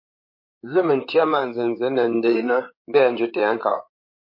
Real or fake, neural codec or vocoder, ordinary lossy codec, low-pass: fake; codec, 16 kHz in and 24 kHz out, 2.2 kbps, FireRedTTS-2 codec; MP3, 32 kbps; 5.4 kHz